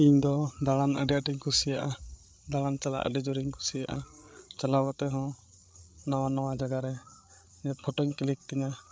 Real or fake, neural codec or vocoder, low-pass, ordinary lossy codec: fake; codec, 16 kHz, 8 kbps, FreqCodec, larger model; none; none